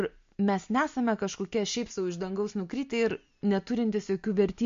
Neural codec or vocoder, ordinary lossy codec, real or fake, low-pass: none; MP3, 48 kbps; real; 7.2 kHz